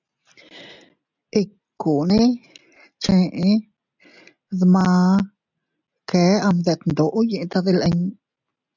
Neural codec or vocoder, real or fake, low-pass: none; real; 7.2 kHz